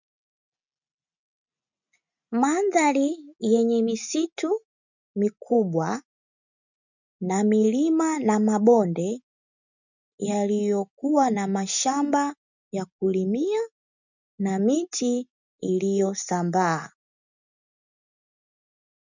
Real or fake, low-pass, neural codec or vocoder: real; 7.2 kHz; none